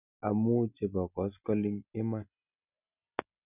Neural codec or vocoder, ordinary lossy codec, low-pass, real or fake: none; none; 3.6 kHz; real